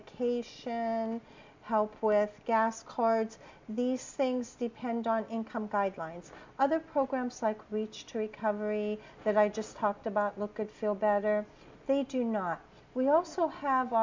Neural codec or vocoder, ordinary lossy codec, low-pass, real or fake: none; MP3, 64 kbps; 7.2 kHz; real